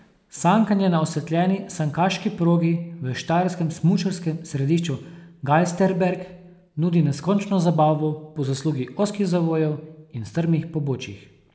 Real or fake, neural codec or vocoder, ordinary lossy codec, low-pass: real; none; none; none